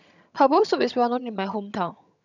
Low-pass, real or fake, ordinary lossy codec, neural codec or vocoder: 7.2 kHz; fake; none; vocoder, 22.05 kHz, 80 mel bands, HiFi-GAN